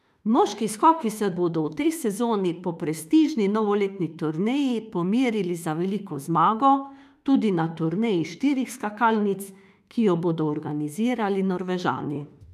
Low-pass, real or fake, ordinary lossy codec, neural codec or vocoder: 14.4 kHz; fake; none; autoencoder, 48 kHz, 32 numbers a frame, DAC-VAE, trained on Japanese speech